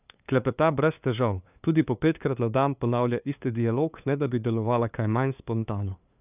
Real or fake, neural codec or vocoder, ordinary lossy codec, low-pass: fake; codec, 16 kHz, 2 kbps, FunCodec, trained on LibriTTS, 25 frames a second; none; 3.6 kHz